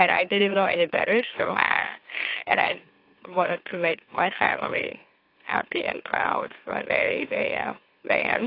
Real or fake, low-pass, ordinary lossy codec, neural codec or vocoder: fake; 5.4 kHz; AAC, 32 kbps; autoencoder, 44.1 kHz, a latent of 192 numbers a frame, MeloTTS